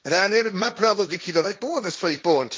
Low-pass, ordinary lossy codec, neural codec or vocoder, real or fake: none; none; codec, 16 kHz, 1.1 kbps, Voila-Tokenizer; fake